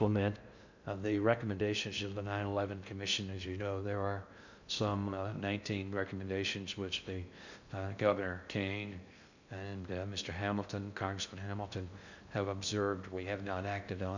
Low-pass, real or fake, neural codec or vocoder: 7.2 kHz; fake; codec, 16 kHz in and 24 kHz out, 0.6 kbps, FocalCodec, streaming, 2048 codes